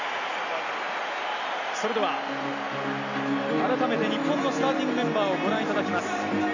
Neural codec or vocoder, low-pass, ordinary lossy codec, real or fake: none; 7.2 kHz; none; real